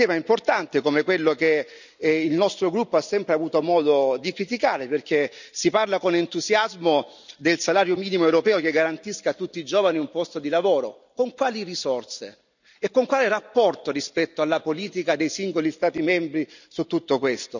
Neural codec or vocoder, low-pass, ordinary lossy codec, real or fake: none; 7.2 kHz; none; real